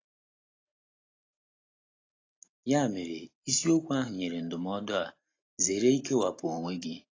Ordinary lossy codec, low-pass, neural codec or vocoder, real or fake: AAC, 32 kbps; 7.2 kHz; none; real